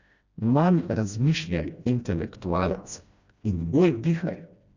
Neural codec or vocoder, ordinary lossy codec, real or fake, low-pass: codec, 16 kHz, 1 kbps, FreqCodec, smaller model; Opus, 64 kbps; fake; 7.2 kHz